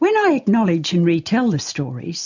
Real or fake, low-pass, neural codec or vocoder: real; 7.2 kHz; none